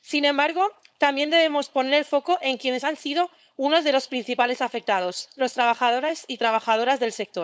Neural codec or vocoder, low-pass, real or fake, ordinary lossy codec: codec, 16 kHz, 4.8 kbps, FACodec; none; fake; none